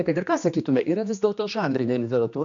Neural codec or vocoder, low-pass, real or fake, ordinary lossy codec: codec, 16 kHz, 2 kbps, X-Codec, HuBERT features, trained on general audio; 7.2 kHz; fake; MP3, 64 kbps